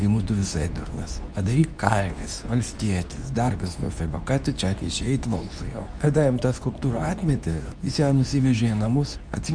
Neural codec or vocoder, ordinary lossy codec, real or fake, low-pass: codec, 24 kHz, 0.9 kbps, WavTokenizer, medium speech release version 2; MP3, 96 kbps; fake; 9.9 kHz